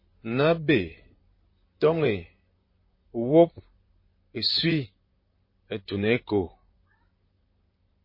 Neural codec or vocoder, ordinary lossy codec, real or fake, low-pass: vocoder, 44.1 kHz, 128 mel bands, Pupu-Vocoder; MP3, 24 kbps; fake; 5.4 kHz